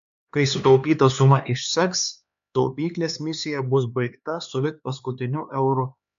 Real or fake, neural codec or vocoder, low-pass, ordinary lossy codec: fake; codec, 16 kHz, 2 kbps, X-Codec, HuBERT features, trained on LibriSpeech; 7.2 kHz; MP3, 64 kbps